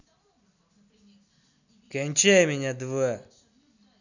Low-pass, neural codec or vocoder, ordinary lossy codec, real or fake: 7.2 kHz; none; none; real